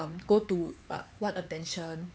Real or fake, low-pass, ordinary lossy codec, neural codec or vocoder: fake; none; none; codec, 16 kHz, 4 kbps, X-Codec, HuBERT features, trained on LibriSpeech